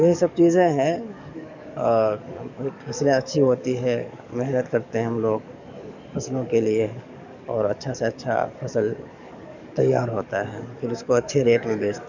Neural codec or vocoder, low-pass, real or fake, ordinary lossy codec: codec, 44.1 kHz, 7.8 kbps, DAC; 7.2 kHz; fake; none